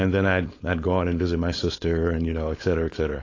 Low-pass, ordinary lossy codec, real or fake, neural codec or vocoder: 7.2 kHz; AAC, 32 kbps; fake; codec, 16 kHz, 4.8 kbps, FACodec